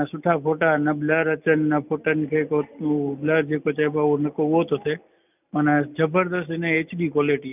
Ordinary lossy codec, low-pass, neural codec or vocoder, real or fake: none; 3.6 kHz; none; real